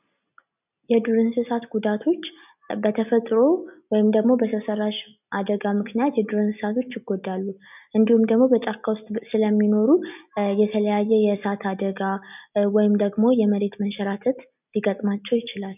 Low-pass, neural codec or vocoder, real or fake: 3.6 kHz; none; real